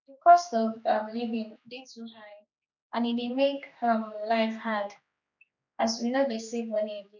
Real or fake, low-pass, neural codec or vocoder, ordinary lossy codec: fake; 7.2 kHz; codec, 16 kHz, 2 kbps, X-Codec, HuBERT features, trained on general audio; none